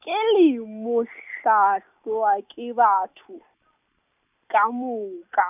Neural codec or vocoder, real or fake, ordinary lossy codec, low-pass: none; real; none; 3.6 kHz